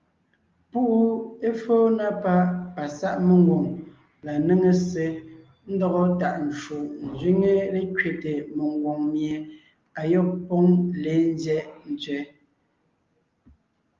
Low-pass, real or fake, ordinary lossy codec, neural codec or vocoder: 7.2 kHz; real; Opus, 32 kbps; none